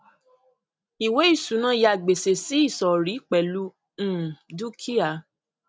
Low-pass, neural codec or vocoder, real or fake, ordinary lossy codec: none; none; real; none